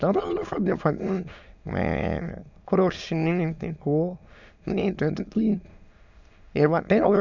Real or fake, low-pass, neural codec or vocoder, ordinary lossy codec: fake; 7.2 kHz; autoencoder, 22.05 kHz, a latent of 192 numbers a frame, VITS, trained on many speakers; none